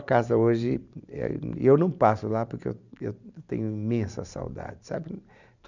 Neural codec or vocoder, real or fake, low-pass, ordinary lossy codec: vocoder, 44.1 kHz, 128 mel bands every 512 samples, BigVGAN v2; fake; 7.2 kHz; none